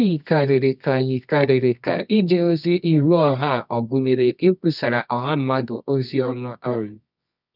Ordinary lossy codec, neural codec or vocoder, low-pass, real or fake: none; codec, 24 kHz, 0.9 kbps, WavTokenizer, medium music audio release; 5.4 kHz; fake